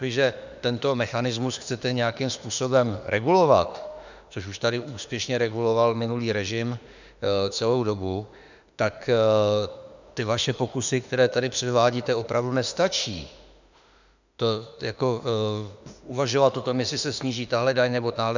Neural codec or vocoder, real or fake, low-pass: autoencoder, 48 kHz, 32 numbers a frame, DAC-VAE, trained on Japanese speech; fake; 7.2 kHz